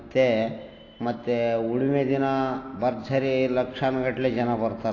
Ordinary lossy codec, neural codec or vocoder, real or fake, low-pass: AAC, 32 kbps; none; real; 7.2 kHz